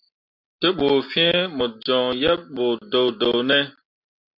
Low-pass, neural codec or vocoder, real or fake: 5.4 kHz; none; real